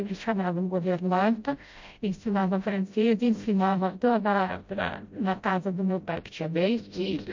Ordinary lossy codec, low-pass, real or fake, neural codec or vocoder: MP3, 48 kbps; 7.2 kHz; fake; codec, 16 kHz, 0.5 kbps, FreqCodec, smaller model